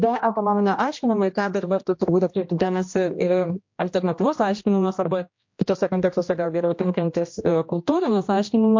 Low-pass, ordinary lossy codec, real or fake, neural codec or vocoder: 7.2 kHz; MP3, 48 kbps; fake; codec, 16 kHz, 1 kbps, X-Codec, HuBERT features, trained on general audio